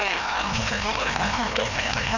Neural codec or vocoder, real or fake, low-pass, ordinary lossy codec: codec, 16 kHz, 1 kbps, FreqCodec, larger model; fake; 7.2 kHz; none